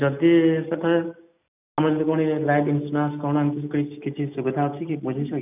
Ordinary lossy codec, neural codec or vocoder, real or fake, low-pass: none; none; real; 3.6 kHz